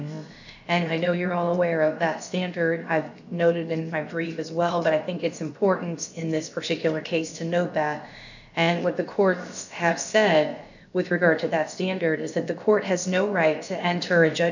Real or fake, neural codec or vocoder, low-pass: fake; codec, 16 kHz, about 1 kbps, DyCAST, with the encoder's durations; 7.2 kHz